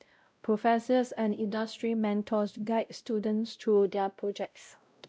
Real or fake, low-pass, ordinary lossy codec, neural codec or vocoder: fake; none; none; codec, 16 kHz, 0.5 kbps, X-Codec, WavLM features, trained on Multilingual LibriSpeech